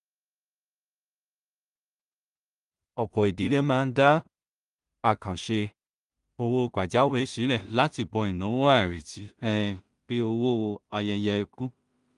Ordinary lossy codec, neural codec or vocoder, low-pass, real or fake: Opus, 32 kbps; codec, 16 kHz in and 24 kHz out, 0.4 kbps, LongCat-Audio-Codec, two codebook decoder; 10.8 kHz; fake